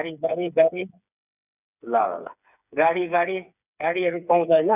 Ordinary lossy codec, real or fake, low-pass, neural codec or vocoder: none; fake; 3.6 kHz; codec, 16 kHz, 4 kbps, FreqCodec, smaller model